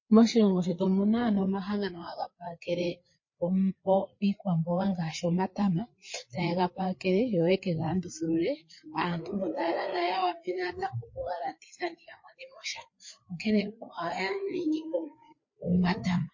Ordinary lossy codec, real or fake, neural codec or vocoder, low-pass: MP3, 32 kbps; fake; codec, 16 kHz, 4 kbps, FreqCodec, larger model; 7.2 kHz